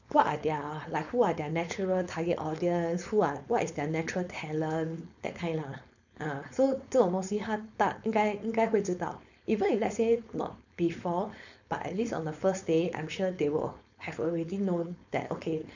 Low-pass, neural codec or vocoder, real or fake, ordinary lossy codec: 7.2 kHz; codec, 16 kHz, 4.8 kbps, FACodec; fake; none